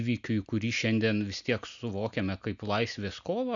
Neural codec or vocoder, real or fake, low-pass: none; real; 7.2 kHz